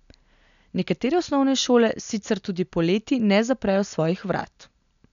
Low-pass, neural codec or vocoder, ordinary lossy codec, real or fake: 7.2 kHz; none; none; real